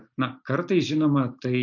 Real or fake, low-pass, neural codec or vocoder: real; 7.2 kHz; none